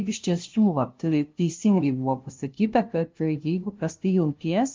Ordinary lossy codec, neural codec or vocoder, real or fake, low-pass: Opus, 32 kbps; codec, 16 kHz, 0.5 kbps, FunCodec, trained on LibriTTS, 25 frames a second; fake; 7.2 kHz